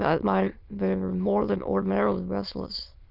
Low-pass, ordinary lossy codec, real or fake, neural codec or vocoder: 5.4 kHz; Opus, 24 kbps; fake; autoencoder, 22.05 kHz, a latent of 192 numbers a frame, VITS, trained on many speakers